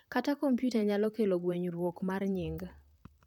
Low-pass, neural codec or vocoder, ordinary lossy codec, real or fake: 19.8 kHz; vocoder, 44.1 kHz, 128 mel bands, Pupu-Vocoder; none; fake